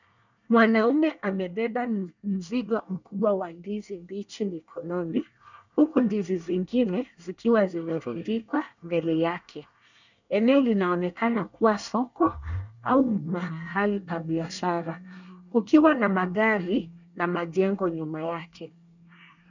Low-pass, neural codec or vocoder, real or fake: 7.2 kHz; codec, 24 kHz, 1 kbps, SNAC; fake